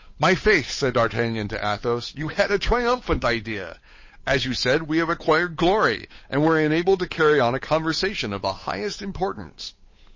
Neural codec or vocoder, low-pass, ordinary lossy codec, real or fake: codec, 16 kHz, 8 kbps, FunCodec, trained on Chinese and English, 25 frames a second; 7.2 kHz; MP3, 32 kbps; fake